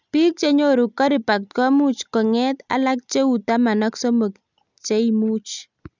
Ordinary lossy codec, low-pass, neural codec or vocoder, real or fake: none; 7.2 kHz; none; real